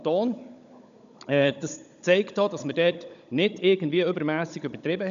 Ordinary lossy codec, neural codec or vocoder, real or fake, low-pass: none; codec, 16 kHz, 16 kbps, FunCodec, trained on Chinese and English, 50 frames a second; fake; 7.2 kHz